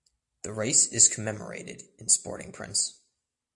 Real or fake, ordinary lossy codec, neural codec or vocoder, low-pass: real; AAC, 64 kbps; none; 10.8 kHz